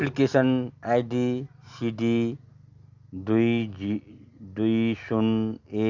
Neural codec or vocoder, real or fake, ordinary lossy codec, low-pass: none; real; none; 7.2 kHz